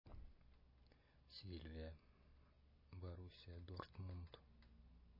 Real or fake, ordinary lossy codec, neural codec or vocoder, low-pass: real; MP3, 24 kbps; none; 5.4 kHz